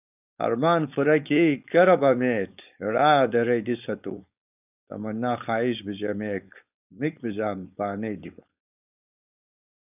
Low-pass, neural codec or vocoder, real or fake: 3.6 kHz; codec, 16 kHz, 4.8 kbps, FACodec; fake